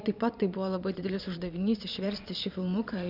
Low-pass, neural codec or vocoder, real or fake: 5.4 kHz; vocoder, 22.05 kHz, 80 mel bands, Vocos; fake